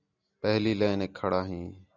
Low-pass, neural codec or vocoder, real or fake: 7.2 kHz; none; real